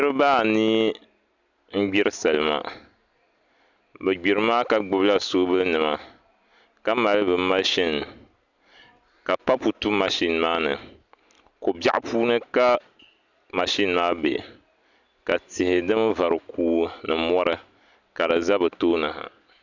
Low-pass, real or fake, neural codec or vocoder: 7.2 kHz; real; none